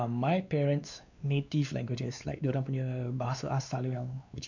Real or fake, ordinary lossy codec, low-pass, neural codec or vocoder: fake; none; 7.2 kHz; codec, 16 kHz, 2 kbps, X-Codec, WavLM features, trained on Multilingual LibriSpeech